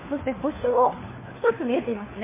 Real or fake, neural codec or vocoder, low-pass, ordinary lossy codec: fake; codec, 16 kHz, 4 kbps, FunCodec, trained on LibriTTS, 50 frames a second; 3.6 kHz; MP3, 16 kbps